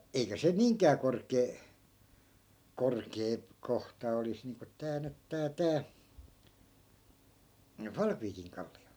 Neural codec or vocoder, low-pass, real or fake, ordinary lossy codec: none; none; real; none